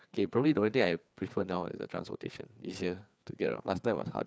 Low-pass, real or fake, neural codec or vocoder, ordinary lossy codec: none; fake; codec, 16 kHz, 4 kbps, FreqCodec, larger model; none